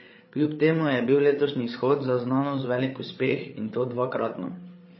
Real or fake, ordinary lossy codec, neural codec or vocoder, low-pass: fake; MP3, 24 kbps; codec, 16 kHz, 8 kbps, FreqCodec, larger model; 7.2 kHz